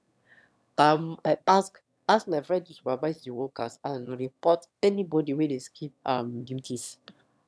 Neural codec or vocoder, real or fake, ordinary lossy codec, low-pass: autoencoder, 22.05 kHz, a latent of 192 numbers a frame, VITS, trained on one speaker; fake; none; none